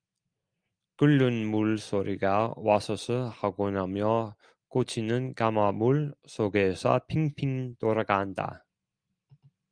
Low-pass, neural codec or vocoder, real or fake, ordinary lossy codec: 9.9 kHz; none; real; Opus, 32 kbps